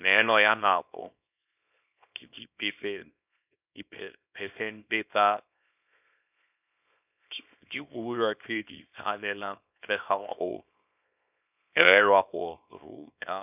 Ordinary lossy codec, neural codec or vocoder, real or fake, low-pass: none; codec, 24 kHz, 0.9 kbps, WavTokenizer, small release; fake; 3.6 kHz